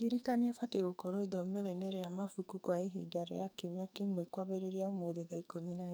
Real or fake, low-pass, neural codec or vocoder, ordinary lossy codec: fake; none; codec, 44.1 kHz, 2.6 kbps, SNAC; none